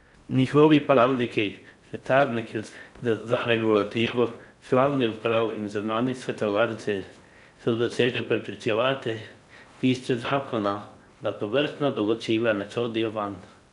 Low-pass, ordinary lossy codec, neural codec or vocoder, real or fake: 10.8 kHz; none; codec, 16 kHz in and 24 kHz out, 0.6 kbps, FocalCodec, streaming, 4096 codes; fake